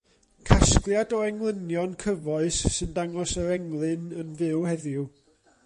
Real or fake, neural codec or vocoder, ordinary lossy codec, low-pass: fake; vocoder, 24 kHz, 100 mel bands, Vocos; MP3, 48 kbps; 10.8 kHz